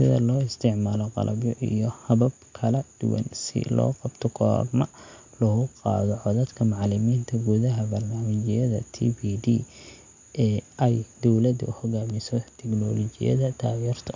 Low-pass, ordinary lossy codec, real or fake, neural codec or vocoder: 7.2 kHz; MP3, 48 kbps; real; none